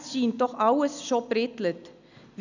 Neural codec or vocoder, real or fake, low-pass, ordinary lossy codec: none; real; 7.2 kHz; none